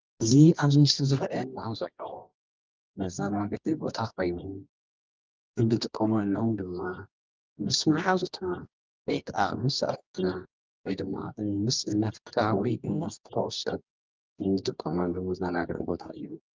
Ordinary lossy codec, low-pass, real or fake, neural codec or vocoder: Opus, 24 kbps; 7.2 kHz; fake; codec, 24 kHz, 0.9 kbps, WavTokenizer, medium music audio release